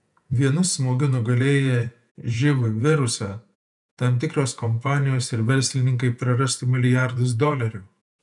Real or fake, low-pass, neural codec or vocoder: fake; 10.8 kHz; vocoder, 48 kHz, 128 mel bands, Vocos